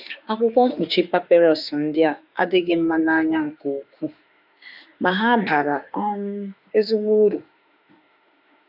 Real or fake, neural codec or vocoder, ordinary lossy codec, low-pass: fake; autoencoder, 48 kHz, 32 numbers a frame, DAC-VAE, trained on Japanese speech; none; 5.4 kHz